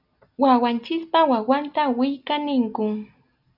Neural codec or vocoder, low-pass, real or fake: none; 5.4 kHz; real